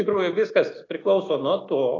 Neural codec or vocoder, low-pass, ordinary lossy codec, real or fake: none; 7.2 kHz; AAC, 32 kbps; real